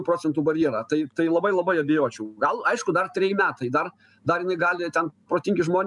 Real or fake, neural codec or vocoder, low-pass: real; none; 10.8 kHz